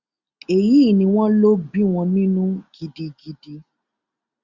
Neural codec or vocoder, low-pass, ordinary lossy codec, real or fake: none; 7.2 kHz; Opus, 64 kbps; real